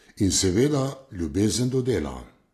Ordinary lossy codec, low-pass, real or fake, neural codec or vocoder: AAC, 48 kbps; 14.4 kHz; real; none